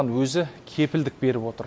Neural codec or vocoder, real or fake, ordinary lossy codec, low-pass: none; real; none; none